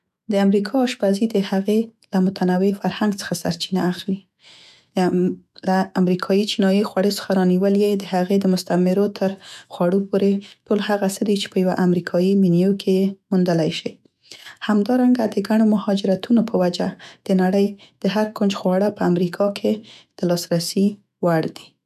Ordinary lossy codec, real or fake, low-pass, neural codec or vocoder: none; fake; 14.4 kHz; autoencoder, 48 kHz, 128 numbers a frame, DAC-VAE, trained on Japanese speech